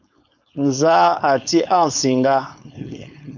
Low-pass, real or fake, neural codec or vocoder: 7.2 kHz; fake; codec, 16 kHz, 4.8 kbps, FACodec